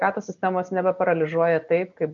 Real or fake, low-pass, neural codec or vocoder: real; 7.2 kHz; none